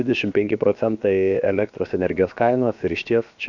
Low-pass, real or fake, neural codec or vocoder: 7.2 kHz; fake; codec, 16 kHz, about 1 kbps, DyCAST, with the encoder's durations